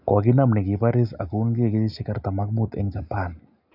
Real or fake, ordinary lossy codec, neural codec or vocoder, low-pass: real; none; none; 5.4 kHz